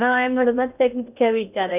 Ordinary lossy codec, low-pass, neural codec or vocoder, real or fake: none; 3.6 kHz; codec, 16 kHz in and 24 kHz out, 0.6 kbps, FocalCodec, streaming, 2048 codes; fake